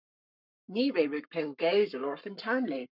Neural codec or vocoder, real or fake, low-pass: codec, 44.1 kHz, 7.8 kbps, Pupu-Codec; fake; 5.4 kHz